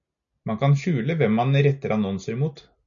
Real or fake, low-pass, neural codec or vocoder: real; 7.2 kHz; none